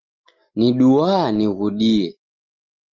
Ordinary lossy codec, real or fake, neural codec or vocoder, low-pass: Opus, 24 kbps; real; none; 7.2 kHz